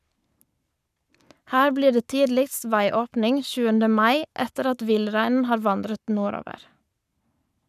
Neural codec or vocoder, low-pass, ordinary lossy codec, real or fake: codec, 44.1 kHz, 7.8 kbps, Pupu-Codec; 14.4 kHz; none; fake